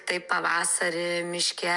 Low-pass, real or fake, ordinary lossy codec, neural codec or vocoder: 10.8 kHz; real; MP3, 96 kbps; none